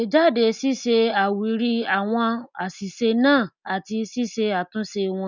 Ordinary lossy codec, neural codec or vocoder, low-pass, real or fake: none; none; 7.2 kHz; real